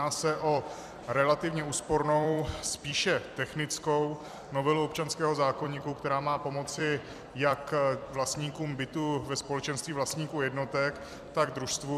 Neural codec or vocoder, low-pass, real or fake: vocoder, 44.1 kHz, 128 mel bands every 256 samples, BigVGAN v2; 14.4 kHz; fake